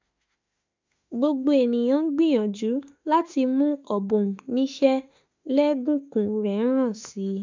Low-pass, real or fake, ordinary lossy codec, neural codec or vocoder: 7.2 kHz; fake; MP3, 64 kbps; autoencoder, 48 kHz, 32 numbers a frame, DAC-VAE, trained on Japanese speech